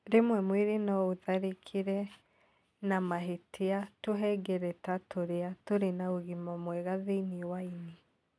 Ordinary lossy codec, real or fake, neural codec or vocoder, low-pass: none; real; none; none